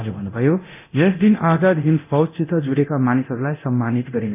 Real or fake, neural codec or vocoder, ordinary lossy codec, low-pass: fake; codec, 24 kHz, 0.9 kbps, DualCodec; none; 3.6 kHz